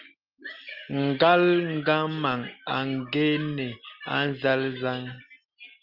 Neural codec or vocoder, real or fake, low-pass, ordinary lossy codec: none; real; 5.4 kHz; Opus, 32 kbps